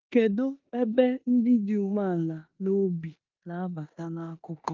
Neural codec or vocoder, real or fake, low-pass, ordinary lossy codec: codec, 16 kHz in and 24 kHz out, 0.9 kbps, LongCat-Audio-Codec, four codebook decoder; fake; 7.2 kHz; Opus, 24 kbps